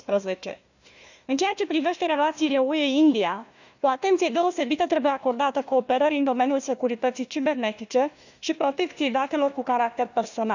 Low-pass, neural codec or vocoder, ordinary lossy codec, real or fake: 7.2 kHz; codec, 16 kHz, 1 kbps, FunCodec, trained on Chinese and English, 50 frames a second; none; fake